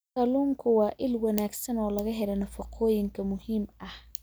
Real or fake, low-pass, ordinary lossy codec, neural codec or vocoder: real; none; none; none